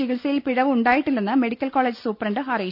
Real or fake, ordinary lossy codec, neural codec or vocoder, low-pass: real; none; none; 5.4 kHz